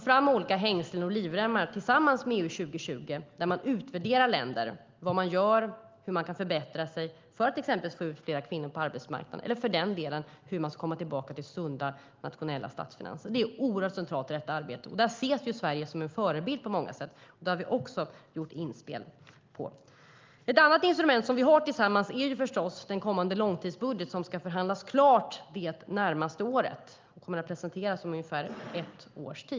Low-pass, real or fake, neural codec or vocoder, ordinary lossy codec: 7.2 kHz; real; none; Opus, 32 kbps